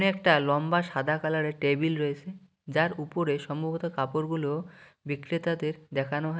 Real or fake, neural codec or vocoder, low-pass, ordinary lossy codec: real; none; none; none